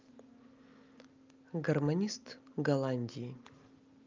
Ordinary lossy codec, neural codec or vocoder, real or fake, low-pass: Opus, 24 kbps; none; real; 7.2 kHz